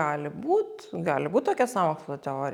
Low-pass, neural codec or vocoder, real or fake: 19.8 kHz; none; real